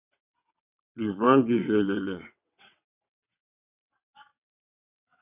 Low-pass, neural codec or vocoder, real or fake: 3.6 kHz; vocoder, 22.05 kHz, 80 mel bands, WaveNeXt; fake